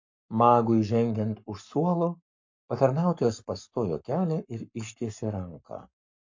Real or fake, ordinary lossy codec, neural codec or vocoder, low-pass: fake; MP3, 48 kbps; codec, 44.1 kHz, 7.8 kbps, Pupu-Codec; 7.2 kHz